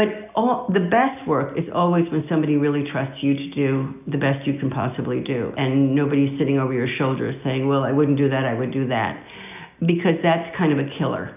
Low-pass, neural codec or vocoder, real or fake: 3.6 kHz; none; real